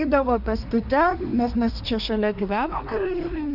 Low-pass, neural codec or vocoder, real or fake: 5.4 kHz; codec, 24 kHz, 1 kbps, SNAC; fake